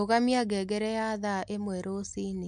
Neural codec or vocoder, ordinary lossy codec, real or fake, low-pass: none; MP3, 64 kbps; real; 9.9 kHz